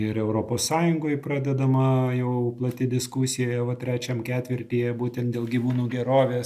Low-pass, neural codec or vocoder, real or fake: 14.4 kHz; none; real